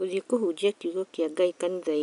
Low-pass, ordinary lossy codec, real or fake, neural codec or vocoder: 10.8 kHz; none; real; none